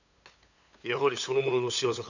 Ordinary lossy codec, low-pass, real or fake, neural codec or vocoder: none; 7.2 kHz; fake; codec, 16 kHz, 8 kbps, FunCodec, trained on LibriTTS, 25 frames a second